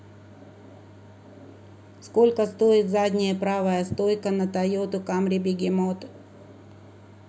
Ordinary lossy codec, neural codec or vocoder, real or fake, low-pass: none; none; real; none